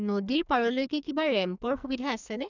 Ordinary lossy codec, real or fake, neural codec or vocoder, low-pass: none; fake; codec, 44.1 kHz, 2.6 kbps, SNAC; 7.2 kHz